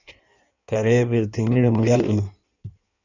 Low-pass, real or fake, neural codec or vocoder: 7.2 kHz; fake; codec, 16 kHz in and 24 kHz out, 1.1 kbps, FireRedTTS-2 codec